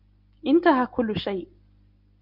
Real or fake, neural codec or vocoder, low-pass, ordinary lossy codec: real; none; 5.4 kHz; Opus, 64 kbps